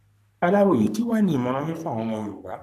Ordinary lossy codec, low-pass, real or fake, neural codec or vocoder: none; 14.4 kHz; fake; codec, 44.1 kHz, 3.4 kbps, Pupu-Codec